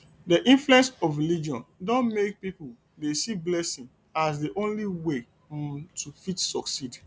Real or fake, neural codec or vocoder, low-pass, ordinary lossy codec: real; none; none; none